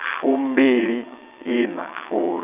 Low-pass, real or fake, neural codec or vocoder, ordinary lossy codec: 3.6 kHz; fake; vocoder, 22.05 kHz, 80 mel bands, WaveNeXt; none